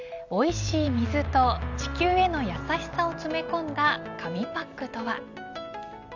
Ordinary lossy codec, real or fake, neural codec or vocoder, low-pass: none; real; none; 7.2 kHz